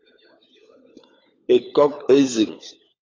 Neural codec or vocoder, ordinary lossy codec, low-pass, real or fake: codec, 16 kHz, 16 kbps, FunCodec, trained on LibriTTS, 50 frames a second; AAC, 32 kbps; 7.2 kHz; fake